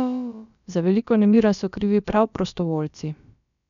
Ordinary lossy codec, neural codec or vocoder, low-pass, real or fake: none; codec, 16 kHz, about 1 kbps, DyCAST, with the encoder's durations; 7.2 kHz; fake